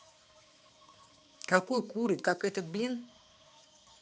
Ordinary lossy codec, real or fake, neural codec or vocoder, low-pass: none; fake; codec, 16 kHz, 2 kbps, X-Codec, HuBERT features, trained on balanced general audio; none